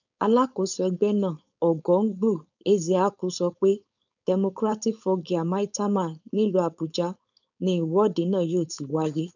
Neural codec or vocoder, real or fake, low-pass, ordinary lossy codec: codec, 16 kHz, 4.8 kbps, FACodec; fake; 7.2 kHz; none